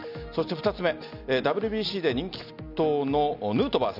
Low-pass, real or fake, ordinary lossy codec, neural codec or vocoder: 5.4 kHz; real; none; none